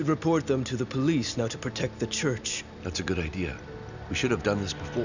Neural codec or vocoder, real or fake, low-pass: none; real; 7.2 kHz